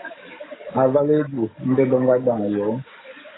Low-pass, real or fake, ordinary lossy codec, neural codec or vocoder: 7.2 kHz; fake; AAC, 16 kbps; codec, 44.1 kHz, 7.8 kbps, DAC